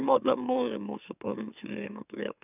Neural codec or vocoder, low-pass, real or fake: autoencoder, 44.1 kHz, a latent of 192 numbers a frame, MeloTTS; 3.6 kHz; fake